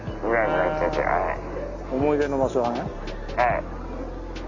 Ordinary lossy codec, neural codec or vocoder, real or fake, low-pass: none; none; real; 7.2 kHz